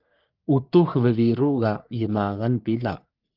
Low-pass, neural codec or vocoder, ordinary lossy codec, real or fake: 5.4 kHz; codec, 44.1 kHz, 3.4 kbps, Pupu-Codec; Opus, 24 kbps; fake